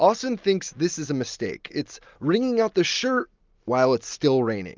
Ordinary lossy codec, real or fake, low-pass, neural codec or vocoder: Opus, 24 kbps; real; 7.2 kHz; none